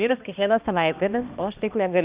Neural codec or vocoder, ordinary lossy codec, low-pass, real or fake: codec, 16 kHz, 1 kbps, X-Codec, HuBERT features, trained on balanced general audio; Opus, 64 kbps; 3.6 kHz; fake